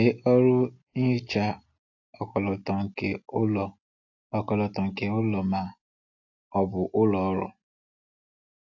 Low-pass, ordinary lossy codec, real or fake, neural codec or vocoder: 7.2 kHz; AAC, 48 kbps; real; none